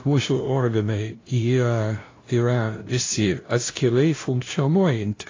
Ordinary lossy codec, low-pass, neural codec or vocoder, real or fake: AAC, 32 kbps; 7.2 kHz; codec, 16 kHz, 0.5 kbps, FunCodec, trained on LibriTTS, 25 frames a second; fake